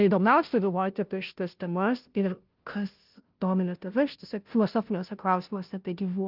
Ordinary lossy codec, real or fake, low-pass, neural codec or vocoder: Opus, 32 kbps; fake; 5.4 kHz; codec, 16 kHz, 0.5 kbps, FunCodec, trained on Chinese and English, 25 frames a second